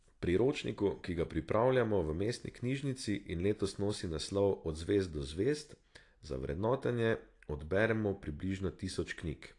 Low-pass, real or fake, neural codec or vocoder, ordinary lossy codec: 10.8 kHz; real; none; AAC, 48 kbps